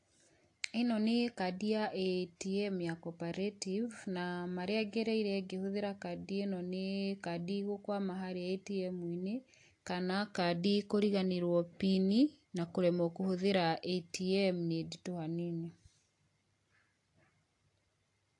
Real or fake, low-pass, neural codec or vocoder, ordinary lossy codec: real; 10.8 kHz; none; AAC, 48 kbps